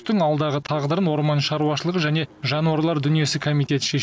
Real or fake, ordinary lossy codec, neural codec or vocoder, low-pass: real; none; none; none